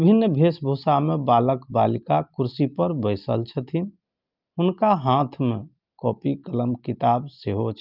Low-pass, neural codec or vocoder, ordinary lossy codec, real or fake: 5.4 kHz; none; Opus, 24 kbps; real